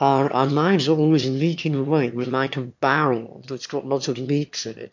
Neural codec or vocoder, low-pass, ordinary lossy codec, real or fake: autoencoder, 22.05 kHz, a latent of 192 numbers a frame, VITS, trained on one speaker; 7.2 kHz; MP3, 48 kbps; fake